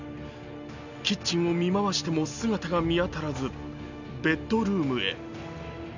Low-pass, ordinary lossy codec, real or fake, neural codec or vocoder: 7.2 kHz; none; real; none